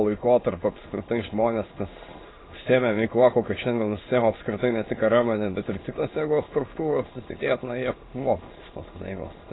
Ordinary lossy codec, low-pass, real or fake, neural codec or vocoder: AAC, 16 kbps; 7.2 kHz; fake; autoencoder, 22.05 kHz, a latent of 192 numbers a frame, VITS, trained on many speakers